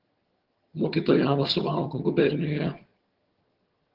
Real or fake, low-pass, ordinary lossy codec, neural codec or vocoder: fake; 5.4 kHz; Opus, 16 kbps; vocoder, 22.05 kHz, 80 mel bands, HiFi-GAN